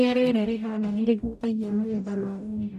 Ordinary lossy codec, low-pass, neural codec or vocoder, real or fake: none; 14.4 kHz; codec, 44.1 kHz, 0.9 kbps, DAC; fake